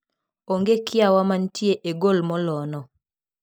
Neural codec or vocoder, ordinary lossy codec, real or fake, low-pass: none; none; real; none